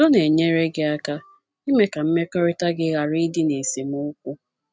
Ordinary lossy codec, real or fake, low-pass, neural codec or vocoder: none; real; none; none